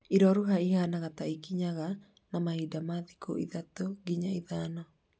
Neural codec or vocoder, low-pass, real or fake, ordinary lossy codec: none; none; real; none